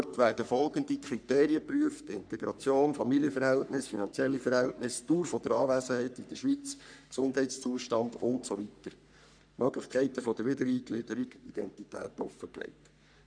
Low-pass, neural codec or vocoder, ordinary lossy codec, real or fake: 9.9 kHz; codec, 44.1 kHz, 3.4 kbps, Pupu-Codec; none; fake